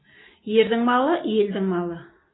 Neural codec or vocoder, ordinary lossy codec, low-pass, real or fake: none; AAC, 16 kbps; 7.2 kHz; real